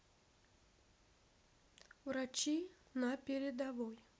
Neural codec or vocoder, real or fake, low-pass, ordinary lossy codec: none; real; none; none